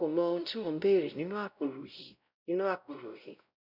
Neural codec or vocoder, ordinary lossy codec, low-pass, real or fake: codec, 16 kHz, 0.5 kbps, X-Codec, WavLM features, trained on Multilingual LibriSpeech; none; 5.4 kHz; fake